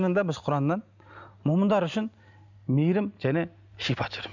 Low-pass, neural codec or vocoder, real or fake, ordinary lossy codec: 7.2 kHz; none; real; none